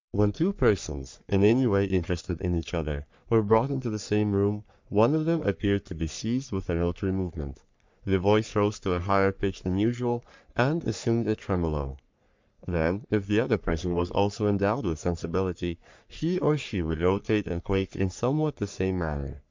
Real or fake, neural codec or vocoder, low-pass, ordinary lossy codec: fake; codec, 44.1 kHz, 3.4 kbps, Pupu-Codec; 7.2 kHz; MP3, 64 kbps